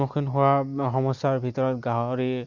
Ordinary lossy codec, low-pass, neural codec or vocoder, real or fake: Opus, 64 kbps; 7.2 kHz; none; real